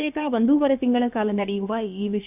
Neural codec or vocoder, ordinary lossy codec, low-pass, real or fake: codec, 16 kHz, about 1 kbps, DyCAST, with the encoder's durations; none; 3.6 kHz; fake